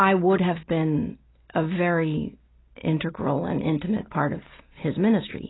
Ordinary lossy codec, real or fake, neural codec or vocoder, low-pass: AAC, 16 kbps; real; none; 7.2 kHz